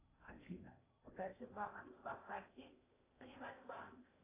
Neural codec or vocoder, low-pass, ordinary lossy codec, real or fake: codec, 16 kHz in and 24 kHz out, 0.8 kbps, FocalCodec, streaming, 65536 codes; 3.6 kHz; AAC, 16 kbps; fake